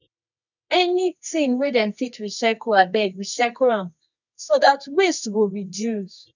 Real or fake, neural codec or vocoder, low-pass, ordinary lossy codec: fake; codec, 24 kHz, 0.9 kbps, WavTokenizer, medium music audio release; 7.2 kHz; none